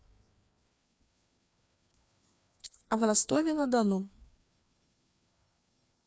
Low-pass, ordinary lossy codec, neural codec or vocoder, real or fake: none; none; codec, 16 kHz, 2 kbps, FreqCodec, larger model; fake